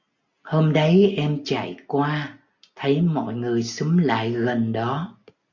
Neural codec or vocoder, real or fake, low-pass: none; real; 7.2 kHz